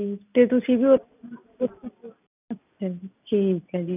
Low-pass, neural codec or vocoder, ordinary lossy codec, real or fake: 3.6 kHz; none; none; real